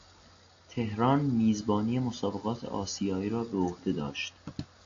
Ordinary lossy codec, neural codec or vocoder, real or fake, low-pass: AAC, 48 kbps; none; real; 7.2 kHz